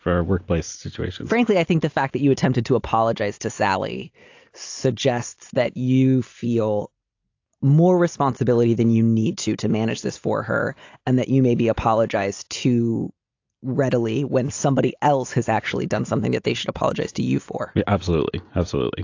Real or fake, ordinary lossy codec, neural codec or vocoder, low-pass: real; AAC, 48 kbps; none; 7.2 kHz